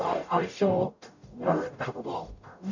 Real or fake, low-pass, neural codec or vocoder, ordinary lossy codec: fake; 7.2 kHz; codec, 44.1 kHz, 0.9 kbps, DAC; none